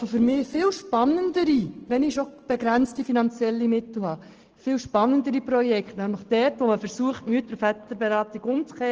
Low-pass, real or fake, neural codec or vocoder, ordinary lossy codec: 7.2 kHz; real; none; Opus, 16 kbps